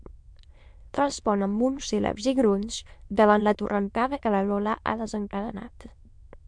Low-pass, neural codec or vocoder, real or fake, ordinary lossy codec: 9.9 kHz; autoencoder, 22.05 kHz, a latent of 192 numbers a frame, VITS, trained on many speakers; fake; MP3, 64 kbps